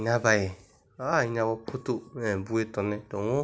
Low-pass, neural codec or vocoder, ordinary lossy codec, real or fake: none; none; none; real